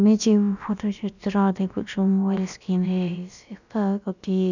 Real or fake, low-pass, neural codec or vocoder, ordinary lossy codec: fake; 7.2 kHz; codec, 16 kHz, about 1 kbps, DyCAST, with the encoder's durations; none